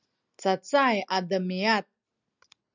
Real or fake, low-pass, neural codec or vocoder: real; 7.2 kHz; none